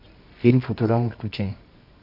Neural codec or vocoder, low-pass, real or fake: codec, 24 kHz, 0.9 kbps, WavTokenizer, medium music audio release; 5.4 kHz; fake